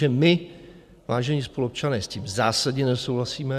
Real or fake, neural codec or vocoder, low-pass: real; none; 14.4 kHz